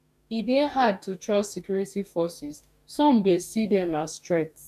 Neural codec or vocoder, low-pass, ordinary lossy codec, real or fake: codec, 44.1 kHz, 2.6 kbps, DAC; 14.4 kHz; none; fake